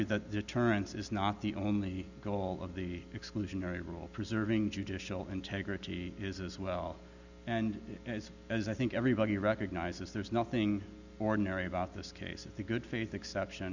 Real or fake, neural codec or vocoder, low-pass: real; none; 7.2 kHz